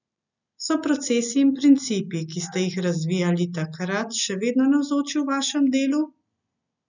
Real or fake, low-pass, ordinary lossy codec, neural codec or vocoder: real; 7.2 kHz; none; none